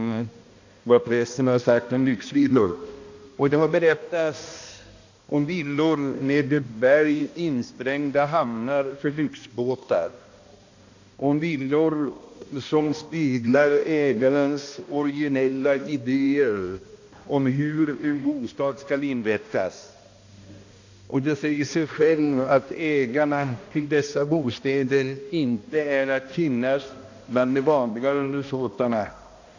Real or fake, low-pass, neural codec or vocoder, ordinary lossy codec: fake; 7.2 kHz; codec, 16 kHz, 1 kbps, X-Codec, HuBERT features, trained on balanced general audio; AAC, 48 kbps